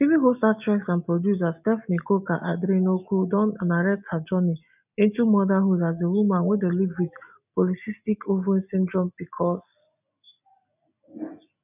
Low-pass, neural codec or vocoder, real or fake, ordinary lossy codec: 3.6 kHz; none; real; none